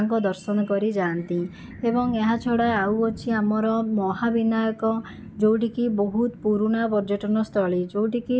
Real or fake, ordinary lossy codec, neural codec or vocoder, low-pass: real; none; none; none